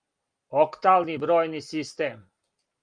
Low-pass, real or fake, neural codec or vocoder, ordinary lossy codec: 9.9 kHz; real; none; Opus, 32 kbps